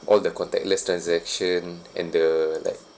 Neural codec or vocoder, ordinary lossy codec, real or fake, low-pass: none; none; real; none